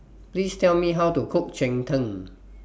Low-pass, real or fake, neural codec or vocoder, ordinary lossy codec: none; real; none; none